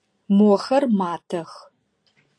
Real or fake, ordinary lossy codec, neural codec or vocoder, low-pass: real; AAC, 48 kbps; none; 9.9 kHz